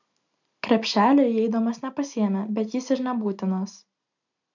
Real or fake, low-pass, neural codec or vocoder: real; 7.2 kHz; none